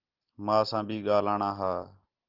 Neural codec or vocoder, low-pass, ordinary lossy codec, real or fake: none; 5.4 kHz; Opus, 16 kbps; real